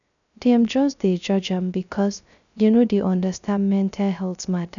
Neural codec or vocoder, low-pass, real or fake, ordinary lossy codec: codec, 16 kHz, 0.3 kbps, FocalCodec; 7.2 kHz; fake; none